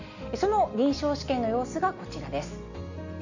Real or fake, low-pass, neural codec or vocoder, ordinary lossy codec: real; 7.2 kHz; none; none